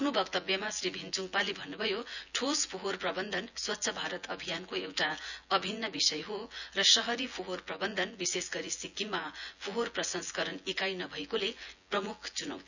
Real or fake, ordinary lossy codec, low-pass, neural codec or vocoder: fake; none; 7.2 kHz; vocoder, 24 kHz, 100 mel bands, Vocos